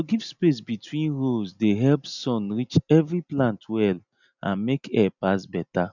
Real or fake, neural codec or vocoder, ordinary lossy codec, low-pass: real; none; none; 7.2 kHz